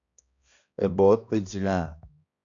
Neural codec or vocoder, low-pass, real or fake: codec, 16 kHz, 1 kbps, X-Codec, HuBERT features, trained on balanced general audio; 7.2 kHz; fake